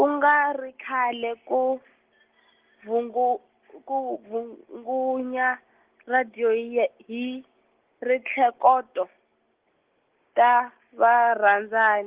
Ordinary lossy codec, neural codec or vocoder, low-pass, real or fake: Opus, 16 kbps; none; 3.6 kHz; real